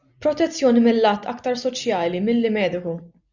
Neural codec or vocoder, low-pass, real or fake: none; 7.2 kHz; real